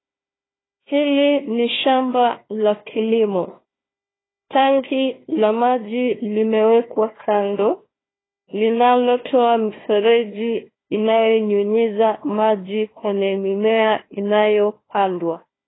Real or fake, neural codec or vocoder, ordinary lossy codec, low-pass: fake; codec, 16 kHz, 1 kbps, FunCodec, trained on Chinese and English, 50 frames a second; AAC, 16 kbps; 7.2 kHz